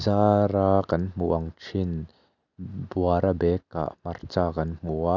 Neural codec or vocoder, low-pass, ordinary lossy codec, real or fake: none; 7.2 kHz; none; real